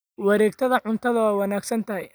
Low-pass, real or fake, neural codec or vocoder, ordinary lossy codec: none; real; none; none